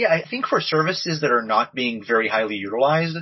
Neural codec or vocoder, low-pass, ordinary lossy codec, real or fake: none; 7.2 kHz; MP3, 24 kbps; real